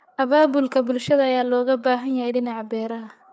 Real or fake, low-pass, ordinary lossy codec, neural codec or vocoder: fake; none; none; codec, 16 kHz, 4 kbps, FreqCodec, larger model